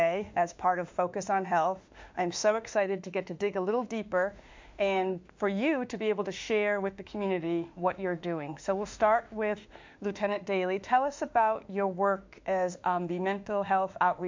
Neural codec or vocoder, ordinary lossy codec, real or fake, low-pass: autoencoder, 48 kHz, 32 numbers a frame, DAC-VAE, trained on Japanese speech; MP3, 64 kbps; fake; 7.2 kHz